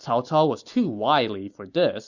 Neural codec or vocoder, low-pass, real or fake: none; 7.2 kHz; real